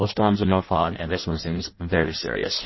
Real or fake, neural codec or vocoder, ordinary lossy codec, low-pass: fake; codec, 16 kHz in and 24 kHz out, 0.6 kbps, FireRedTTS-2 codec; MP3, 24 kbps; 7.2 kHz